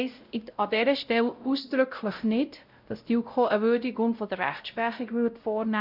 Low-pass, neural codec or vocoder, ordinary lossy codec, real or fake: 5.4 kHz; codec, 16 kHz, 0.5 kbps, X-Codec, WavLM features, trained on Multilingual LibriSpeech; none; fake